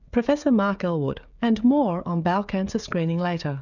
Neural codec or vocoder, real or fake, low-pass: codec, 16 kHz, 16 kbps, FreqCodec, smaller model; fake; 7.2 kHz